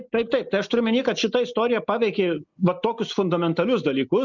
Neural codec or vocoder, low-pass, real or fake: none; 7.2 kHz; real